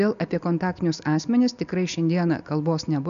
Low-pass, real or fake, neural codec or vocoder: 7.2 kHz; real; none